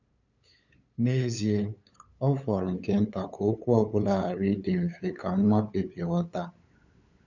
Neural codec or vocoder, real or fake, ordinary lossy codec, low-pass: codec, 16 kHz, 8 kbps, FunCodec, trained on LibriTTS, 25 frames a second; fake; none; 7.2 kHz